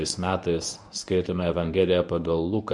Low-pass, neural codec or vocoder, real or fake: 10.8 kHz; codec, 24 kHz, 0.9 kbps, WavTokenizer, medium speech release version 1; fake